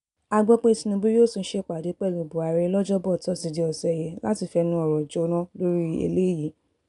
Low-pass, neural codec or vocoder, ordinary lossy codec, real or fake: 10.8 kHz; none; none; real